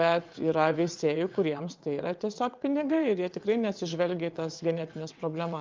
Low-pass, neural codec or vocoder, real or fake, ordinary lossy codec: 7.2 kHz; vocoder, 22.05 kHz, 80 mel bands, WaveNeXt; fake; Opus, 24 kbps